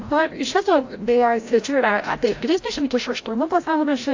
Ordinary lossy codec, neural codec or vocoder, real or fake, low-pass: AAC, 48 kbps; codec, 16 kHz, 0.5 kbps, FreqCodec, larger model; fake; 7.2 kHz